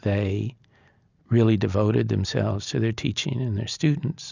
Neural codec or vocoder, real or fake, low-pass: none; real; 7.2 kHz